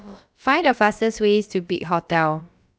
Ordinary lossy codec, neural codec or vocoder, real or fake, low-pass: none; codec, 16 kHz, about 1 kbps, DyCAST, with the encoder's durations; fake; none